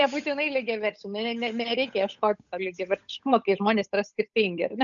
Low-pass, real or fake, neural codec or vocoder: 7.2 kHz; fake; codec, 16 kHz, 8 kbps, FunCodec, trained on Chinese and English, 25 frames a second